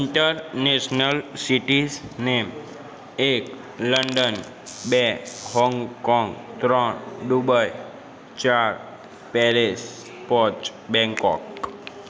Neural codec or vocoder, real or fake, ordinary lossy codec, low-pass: none; real; none; none